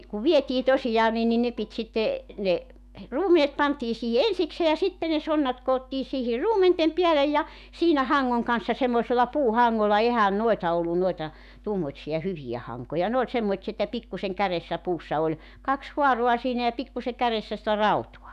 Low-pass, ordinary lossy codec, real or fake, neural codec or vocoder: 14.4 kHz; none; fake; autoencoder, 48 kHz, 128 numbers a frame, DAC-VAE, trained on Japanese speech